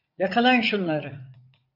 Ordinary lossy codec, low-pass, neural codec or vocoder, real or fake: AAC, 48 kbps; 5.4 kHz; vocoder, 44.1 kHz, 80 mel bands, Vocos; fake